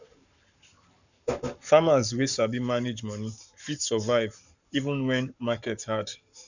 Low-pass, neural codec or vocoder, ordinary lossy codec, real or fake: 7.2 kHz; codec, 44.1 kHz, 7.8 kbps, Pupu-Codec; none; fake